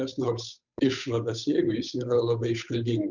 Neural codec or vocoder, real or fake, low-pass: codec, 16 kHz, 8 kbps, FunCodec, trained on Chinese and English, 25 frames a second; fake; 7.2 kHz